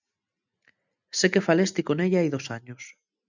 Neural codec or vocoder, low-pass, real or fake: none; 7.2 kHz; real